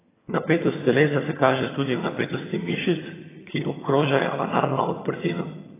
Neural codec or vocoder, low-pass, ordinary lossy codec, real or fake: vocoder, 22.05 kHz, 80 mel bands, HiFi-GAN; 3.6 kHz; AAC, 16 kbps; fake